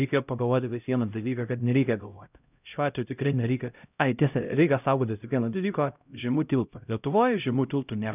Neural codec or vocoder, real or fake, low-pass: codec, 16 kHz, 0.5 kbps, X-Codec, HuBERT features, trained on LibriSpeech; fake; 3.6 kHz